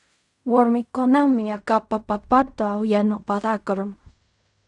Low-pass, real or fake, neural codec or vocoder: 10.8 kHz; fake; codec, 16 kHz in and 24 kHz out, 0.4 kbps, LongCat-Audio-Codec, fine tuned four codebook decoder